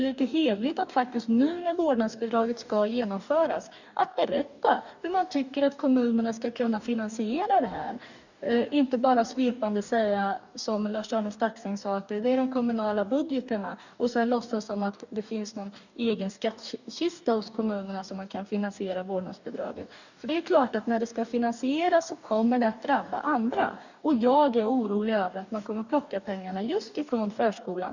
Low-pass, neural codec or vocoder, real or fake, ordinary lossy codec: 7.2 kHz; codec, 44.1 kHz, 2.6 kbps, DAC; fake; none